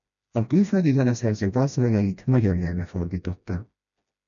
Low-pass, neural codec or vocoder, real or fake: 7.2 kHz; codec, 16 kHz, 1 kbps, FreqCodec, smaller model; fake